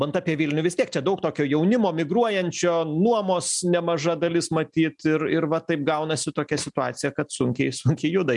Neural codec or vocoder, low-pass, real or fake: none; 10.8 kHz; real